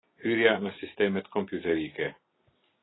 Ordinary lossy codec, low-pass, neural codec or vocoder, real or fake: AAC, 16 kbps; 7.2 kHz; none; real